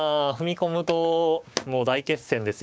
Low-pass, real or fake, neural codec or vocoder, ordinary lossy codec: none; fake; codec, 16 kHz, 6 kbps, DAC; none